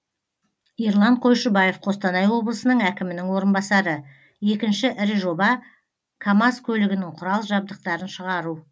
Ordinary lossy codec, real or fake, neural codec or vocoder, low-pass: none; real; none; none